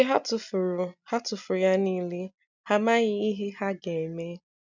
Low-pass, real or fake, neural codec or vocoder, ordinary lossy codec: 7.2 kHz; real; none; none